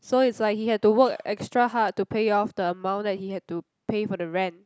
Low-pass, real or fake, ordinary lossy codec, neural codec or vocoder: none; real; none; none